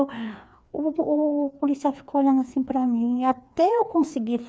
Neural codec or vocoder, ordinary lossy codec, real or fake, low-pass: codec, 16 kHz, 2 kbps, FreqCodec, larger model; none; fake; none